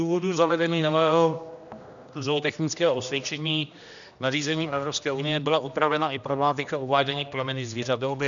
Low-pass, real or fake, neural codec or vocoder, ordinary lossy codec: 7.2 kHz; fake; codec, 16 kHz, 1 kbps, X-Codec, HuBERT features, trained on general audio; MP3, 96 kbps